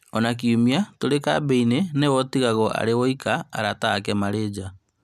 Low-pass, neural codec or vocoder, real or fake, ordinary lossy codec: 14.4 kHz; none; real; none